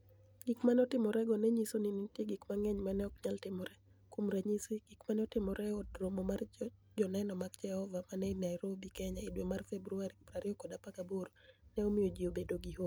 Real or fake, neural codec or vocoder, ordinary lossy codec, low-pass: real; none; none; none